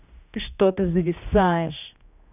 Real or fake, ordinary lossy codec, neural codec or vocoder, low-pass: fake; none; codec, 16 kHz, 1 kbps, X-Codec, HuBERT features, trained on general audio; 3.6 kHz